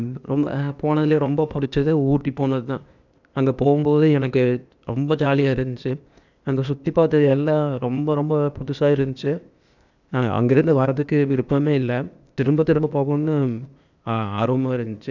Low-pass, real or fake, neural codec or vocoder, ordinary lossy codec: 7.2 kHz; fake; codec, 16 kHz, 0.8 kbps, ZipCodec; none